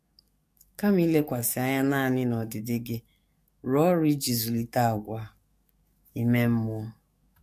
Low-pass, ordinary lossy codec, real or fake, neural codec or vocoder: 14.4 kHz; MP3, 64 kbps; fake; codec, 44.1 kHz, 7.8 kbps, DAC